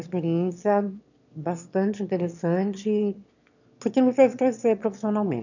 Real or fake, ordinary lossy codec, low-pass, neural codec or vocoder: fake; none; 7.2 kHz; autoencoder, 22.05 kHz, a latent of 192 numbers a frame, VITS, trained on one speaker